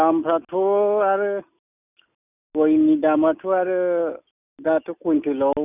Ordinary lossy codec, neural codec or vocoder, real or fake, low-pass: none; none; real; 3.6 kHz